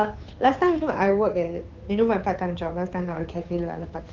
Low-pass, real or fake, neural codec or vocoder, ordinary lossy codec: 7.2 kHz; fake; codec, 16 kHz, 2 kbps, FunCodec, trained on Chinese and English, 25 frames a second; Opus, 32 kbps